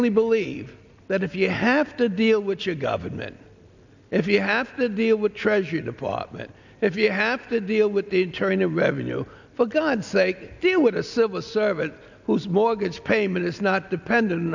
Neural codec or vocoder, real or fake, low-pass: none; real; 7.2 kHz